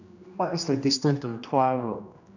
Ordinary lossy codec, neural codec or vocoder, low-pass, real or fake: none; codec, 16 kHz, 1 kbps, X-Codec, HuBERT features, trained on general audio; 7.2 kHz; fake